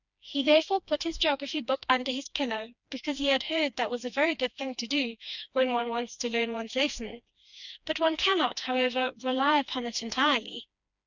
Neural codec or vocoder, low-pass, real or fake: codec, 16 kHz, 2 kbps, FreqCodec, smaller model; 7.2 kHz; fake